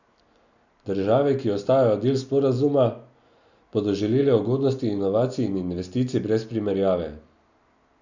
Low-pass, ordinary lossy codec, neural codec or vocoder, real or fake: 7.2 kHz; none; none; real